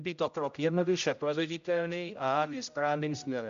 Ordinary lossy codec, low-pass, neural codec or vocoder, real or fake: MP3, 64 kbps; 7.2 kHz; codec, 16 kHz, 0.5 kbps, X-Codec, HuBERT features, trained on general audio; fake